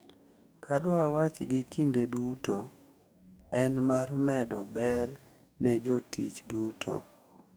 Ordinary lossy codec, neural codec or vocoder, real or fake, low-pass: none; codec, 44.1 kHz, 2.6 kbps, DAC; fake; none